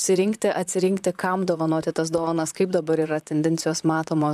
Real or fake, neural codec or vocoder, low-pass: fake; vocoder, 44.1 kHz, 128 mel bands, Pupu-Vocoder; 14.4 kHz